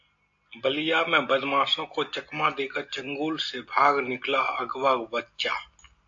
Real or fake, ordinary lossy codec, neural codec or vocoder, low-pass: real; AAC, 48 kbps; none; 7.2 kHz